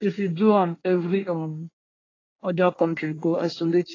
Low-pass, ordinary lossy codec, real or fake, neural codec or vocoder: 7.2 kHz; AAC, 32 kbps; fake; codec, 24 kHz, 1 kbps, SNAC